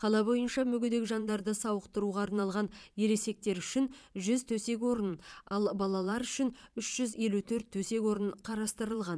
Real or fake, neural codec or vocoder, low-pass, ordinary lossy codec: fake; vocoder, 22.05 kHz, 80 mel bands, Vocos; none; none